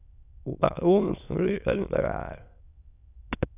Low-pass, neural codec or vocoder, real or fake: 3.6 kHz; autoencoder, 22.05 kHz, a latent of 192 numbers a frame, VITS, trained on many speakers; fake